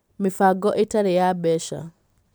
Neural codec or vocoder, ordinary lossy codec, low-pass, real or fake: none; none; none; real